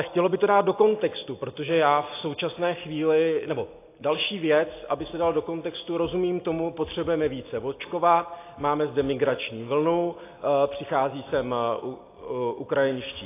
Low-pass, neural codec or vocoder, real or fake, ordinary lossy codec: 3.6 kHz; none; real; AAC, 24 kbps